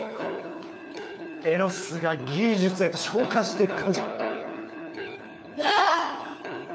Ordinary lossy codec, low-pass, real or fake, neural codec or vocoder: none; none; fake; codec, 16 kHz, 4 kbps, FunCodec, trained on LibriTTS, 50 frames a second